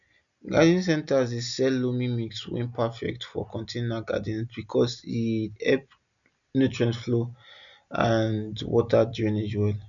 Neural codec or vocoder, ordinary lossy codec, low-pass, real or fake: none; none; 7.2 kHz; real